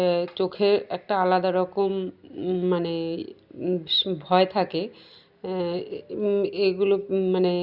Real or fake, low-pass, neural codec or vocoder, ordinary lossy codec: real; 5.4 kHz; none; Opus, 64 kbps